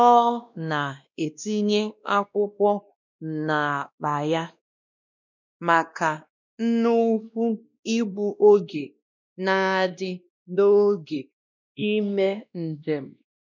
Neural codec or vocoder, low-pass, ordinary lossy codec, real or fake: codec, 16 kHz, 1 kbps, X-Codec, WavLM features, trained on Multilingual LibriSpeech; 7.2 kHz; none; fake